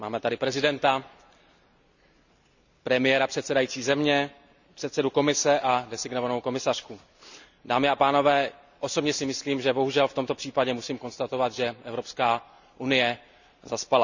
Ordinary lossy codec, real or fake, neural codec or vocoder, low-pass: none; real; none; 7.2 kHz